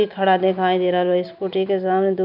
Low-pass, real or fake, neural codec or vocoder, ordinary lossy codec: 5.4 kHz; real; none; none